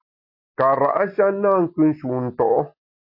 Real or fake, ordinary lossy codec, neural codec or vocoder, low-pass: real; MP3, 32 kbps; none; 5.4 kHz